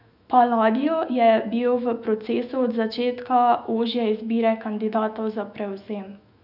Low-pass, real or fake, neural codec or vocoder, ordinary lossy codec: 5.4 kHz; fake; autoencoder, 48 kHz, 128 numbers a frame, DAC-VAE, trained on Japanese speech; none